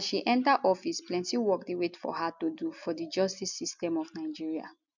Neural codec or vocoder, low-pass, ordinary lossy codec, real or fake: none; 7.2 kHz; none; real